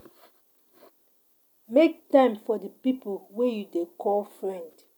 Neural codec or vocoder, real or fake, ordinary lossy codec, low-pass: none; real; none; none